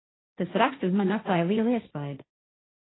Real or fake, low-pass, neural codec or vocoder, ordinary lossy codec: fake; 7.2 kHz; codec, 16 kHz, 1.1 kbps, Voila-Tokenizer; AAC, 16 kbps